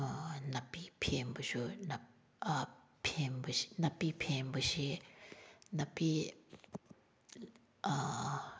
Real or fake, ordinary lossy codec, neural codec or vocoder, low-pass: real; none; none; none